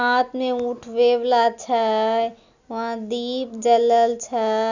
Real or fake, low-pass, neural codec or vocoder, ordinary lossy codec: real; 7.2 kHz; none; none